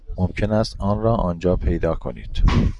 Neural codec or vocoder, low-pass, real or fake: none; 10.8 kHz; real